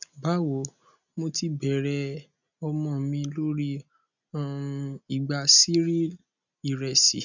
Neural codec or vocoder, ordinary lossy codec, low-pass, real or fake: none; none; 7.2 kHz; real